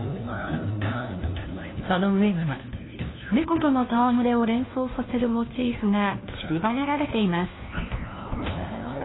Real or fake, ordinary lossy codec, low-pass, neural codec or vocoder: fake; AAC, 16 kbps; 7.2 kHz; codec, 16 kHz, 1 kbps, FunCodec, trained on LibriTTS, 50 frames a second